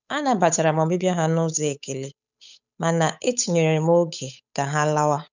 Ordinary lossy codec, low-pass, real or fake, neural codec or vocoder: none; 7.2 kHz; fake; codec, 16 kHz, 8 kbps, FunCodec, trained on Chinese and English, 25 frames a second